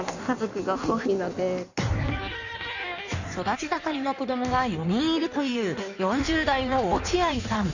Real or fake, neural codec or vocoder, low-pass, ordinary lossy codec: fake; codec, 16 kHz in and 24 kHz out, 1.1 kbps, FireRedTTS-2 codec; 7.2 kHz; none